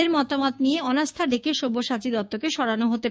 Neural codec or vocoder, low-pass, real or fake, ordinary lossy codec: codec, 16 kHz, 6 kbps, DAC; none; fake; none